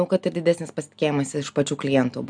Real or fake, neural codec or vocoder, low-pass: real; none; 9.9 kHz